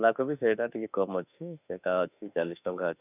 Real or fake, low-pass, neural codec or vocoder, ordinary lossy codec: fake; 3.6 kHz; autoencoder, 48 kHz, 32 numbers a frame, DAC-VAE, trained on Japanese speech; none